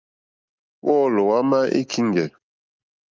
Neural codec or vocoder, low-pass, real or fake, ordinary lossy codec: none; 7.2 kHz; real; Opus, 24 kbps